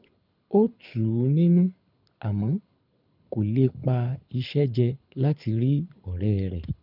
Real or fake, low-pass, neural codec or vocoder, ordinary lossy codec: fake; 5.4 kHz; codec, 24 kHz, 6 kbps, HILCodec; none